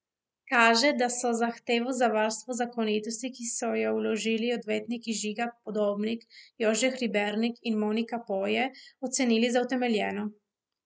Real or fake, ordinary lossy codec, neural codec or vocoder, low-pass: real; none; none; none